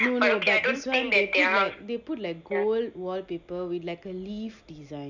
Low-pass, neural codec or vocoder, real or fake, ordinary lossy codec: 7.2 kHz; none; real; none